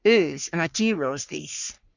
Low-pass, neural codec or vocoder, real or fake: 7.2 kHz; codec, 44.1 kHz, 3.4 kbps, Pupu-Codec; fake